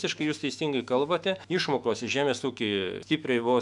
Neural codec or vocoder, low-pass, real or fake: autoencoder, 48 kHz, 128 numbers a frame, DAC-VAE, trained on Japanese speech; 10.8 kHz; fake